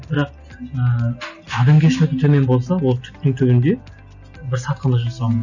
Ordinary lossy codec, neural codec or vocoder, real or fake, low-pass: none; none; real; 7.2 kHz